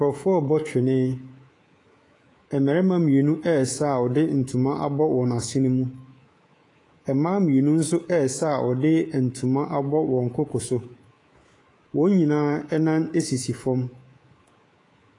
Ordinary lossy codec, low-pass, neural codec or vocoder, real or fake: AAC, 32 kbps; 10.8 kHz; codec, 24 kHz, 3.1 kbps, DualCodec; fake